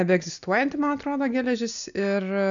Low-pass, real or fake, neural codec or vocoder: 7.2 kHz; real; none